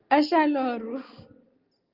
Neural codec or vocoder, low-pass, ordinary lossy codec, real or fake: none; 5.4 kHz; Opus, 24 kbps; real